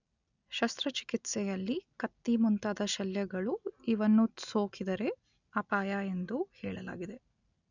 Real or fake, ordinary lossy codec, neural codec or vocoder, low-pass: real; none; none; 7.2 kHz